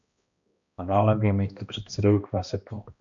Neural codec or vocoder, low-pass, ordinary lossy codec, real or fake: codec, 16 kHz, 1 kbps, X-Codec, HuBERT features, trained on balanced general audio; 7.2 kHz; MP3, 64 kbps; fake